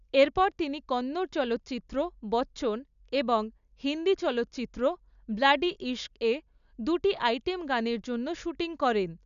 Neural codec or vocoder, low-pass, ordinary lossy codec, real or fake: none; 7.2 kHz; none; real